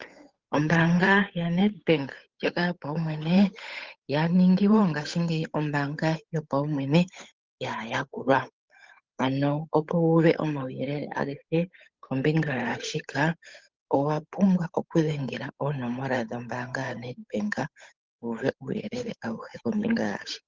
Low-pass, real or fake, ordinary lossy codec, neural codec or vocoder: 7.2 kHz; fake; Opus, 32 kbps; codec, 16 kHz, 8 kbps, FunCodec, trained on Chinese and English, 25 frames a second